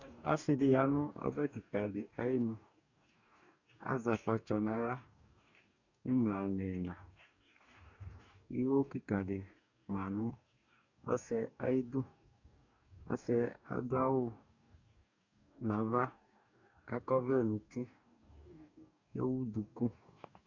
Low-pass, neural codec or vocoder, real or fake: 7.2 kHz; codec, 44.1 kHz, 2.6 kbps, DAC; fake